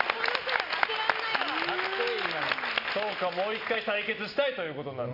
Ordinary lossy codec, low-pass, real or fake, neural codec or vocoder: none; 5.4 kHz; real; none